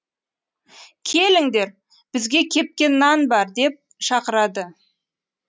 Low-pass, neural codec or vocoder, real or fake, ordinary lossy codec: none; none; real; none